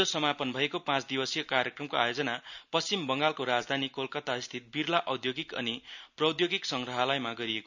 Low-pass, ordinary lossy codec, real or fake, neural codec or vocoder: 7.2 kHz; none; real; none